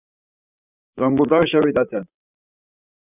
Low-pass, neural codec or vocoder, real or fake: 3.6 kHz; vocoder, 44.1 kHz, 128 mel bands, Pupu-Vocoder; fake